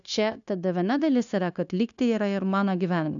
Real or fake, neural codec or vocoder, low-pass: fake; codec, 16 kHz, 0.9 kbps, LongCat-Audio-Codec; 7.2 kHz